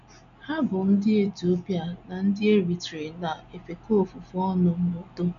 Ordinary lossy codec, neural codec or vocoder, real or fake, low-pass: none; none; real; 7.2 kHz